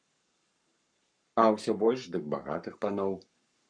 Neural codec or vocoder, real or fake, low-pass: codec, 44.1 kHz, 7.8 kbps, Pupu-Codec; fake; 9.9 kHz